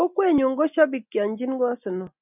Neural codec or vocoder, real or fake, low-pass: none; real; 3.6 kHz